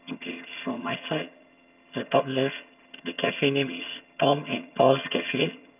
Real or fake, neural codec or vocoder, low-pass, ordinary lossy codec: fake; vocoder, 22.05 kHz, 80 mel bands, HiFi-GAN; 3.6 kHz; none